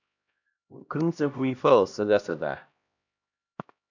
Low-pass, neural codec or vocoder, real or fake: 7.2 kHz; codec, 16 kHz, 1 kbps, X-Codec, HuBERT features, trained on LibriSpeech; fake